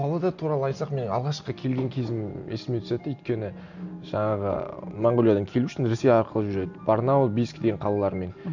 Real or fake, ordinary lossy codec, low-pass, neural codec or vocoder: real; none; 7.2 kHz; none